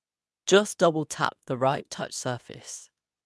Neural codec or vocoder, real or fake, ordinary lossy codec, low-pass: codec, 24 kHz, 0.9 kbps, WavTokenizer, medium speech release version 2; fake; none; none